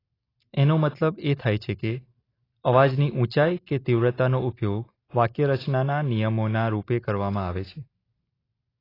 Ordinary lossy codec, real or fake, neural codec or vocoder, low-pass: AAC, 24 kbps; real; none; 5.4 kHz